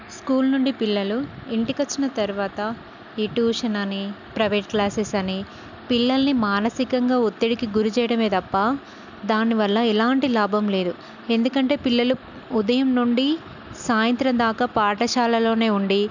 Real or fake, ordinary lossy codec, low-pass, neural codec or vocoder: real; none; 7.2 kHz; none